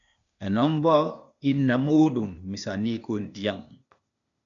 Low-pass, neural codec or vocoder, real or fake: 7.2 kHz; codec, 16 kHz, 0.8 kbps, ZipCodec; fake